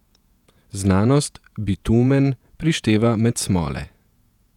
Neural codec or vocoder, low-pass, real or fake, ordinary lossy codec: vocoder, 48 kHz, 128 mel bands, Vocos; 19.8 kHz; fake; none